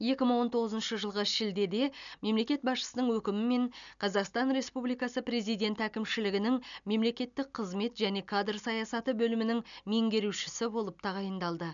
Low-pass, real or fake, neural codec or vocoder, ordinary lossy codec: 7.2 kHz; real; none; none